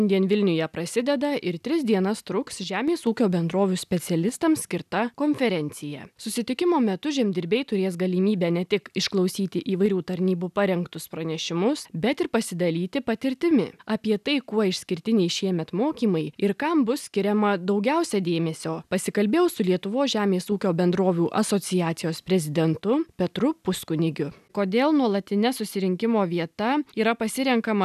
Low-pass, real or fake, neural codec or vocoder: 14.4 kHz; real; none